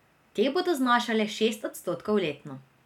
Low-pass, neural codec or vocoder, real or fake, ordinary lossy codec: 19.8 kHz; none; real; none